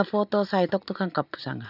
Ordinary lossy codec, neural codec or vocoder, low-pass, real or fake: none; none; 5.4 kHz; real